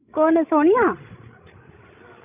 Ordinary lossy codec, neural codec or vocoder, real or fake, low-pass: none; codec, 16 kHz, 16 kbps, FreqCodec, larger model; fake; 3.6 kHz